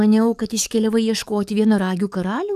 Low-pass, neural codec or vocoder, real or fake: 14.4 kHz; codec, 44.1 kHz, 7.8 kbps, DAC; fake